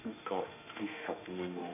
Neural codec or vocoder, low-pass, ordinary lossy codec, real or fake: autoencoder, 48 kHz, 32 numbers a frame, DAC-VAE, trained on Japanese speech; 3.6 kHz; none; fake